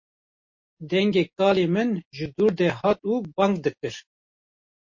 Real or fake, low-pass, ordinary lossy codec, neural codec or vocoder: real; 7.2 kHz; MP3, 32 kbps; none